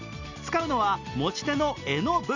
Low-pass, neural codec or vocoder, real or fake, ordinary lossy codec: 7.2 kHz; none; real; none